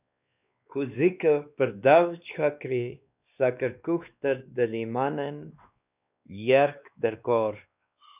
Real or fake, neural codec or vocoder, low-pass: fake; codec, 16 kHz, 2 kbps, X-Codec, WavLM features, trained on Multilingual LibriSpeech; 3.6 kHz